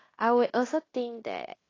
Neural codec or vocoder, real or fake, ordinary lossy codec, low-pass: codec, 16 kHz in and 24 kHz out, 0.9 kbps, LongCat-Audio-Codec, fine tuned four codebook decoder; fake; AAC, 32 kbps; 7.2 kHz